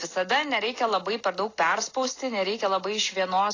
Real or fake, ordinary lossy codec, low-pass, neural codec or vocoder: real; AAC, 32 kbps; 7.2 kHz; none